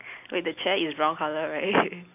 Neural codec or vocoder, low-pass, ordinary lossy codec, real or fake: none; 3.6 kHz; none; real